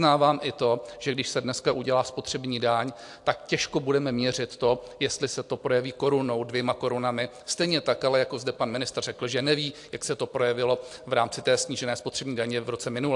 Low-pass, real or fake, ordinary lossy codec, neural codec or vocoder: 10.8 kHz; real; AAC, 64 kbps; none